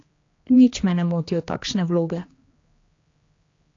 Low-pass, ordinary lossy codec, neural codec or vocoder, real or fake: 7.2 kHz; AAC, 32 kbps; codec, 16 kHz, 2 kbps, X-Codec, HuBERT features, trained on general audio; fake